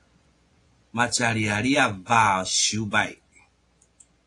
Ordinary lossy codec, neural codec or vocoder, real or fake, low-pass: AAC, 48 kbps; vocoder, 24 kHz, 100 mel bands, Vocos; fake; 10.8 kHz